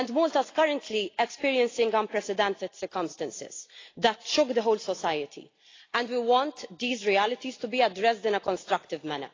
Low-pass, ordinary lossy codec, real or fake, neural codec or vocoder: 7.2 kHz; AAC, 32 kbps; real; none